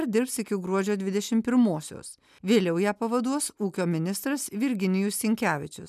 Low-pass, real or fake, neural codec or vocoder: 14.4 kHz; real; none